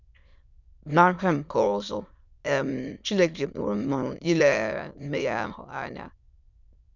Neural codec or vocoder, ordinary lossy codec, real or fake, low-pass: autoencoder, 22.05 kHz, a latent of 192 numbers a frame, VITS, trained on many speakers; none; fake; 7.2 kHz